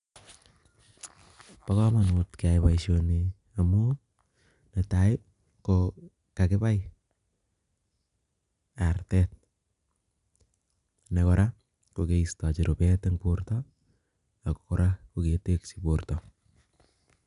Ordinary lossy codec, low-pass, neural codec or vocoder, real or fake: none; 10.8 kHz; none; real